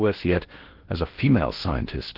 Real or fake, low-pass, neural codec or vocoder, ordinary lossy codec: fake; 5.4 kHz; codec, 16 kHz in and 24 kHz out, 0.8 kbps, FocalCodec, streaming, 65536 codes; Opus, 16 kbps